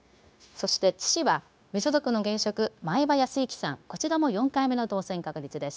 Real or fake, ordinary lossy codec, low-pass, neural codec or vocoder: fake; none; none; codec, 16 kHz, 0.9 kbps, LongCat-Audio-Codec